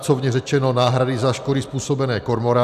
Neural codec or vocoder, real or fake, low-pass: none; real; 14.4 kHz